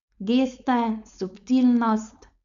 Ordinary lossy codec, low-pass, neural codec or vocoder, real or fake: none; 7.2 kHz; codec, 16 kHz, 4.8 kbps, FACodec; fake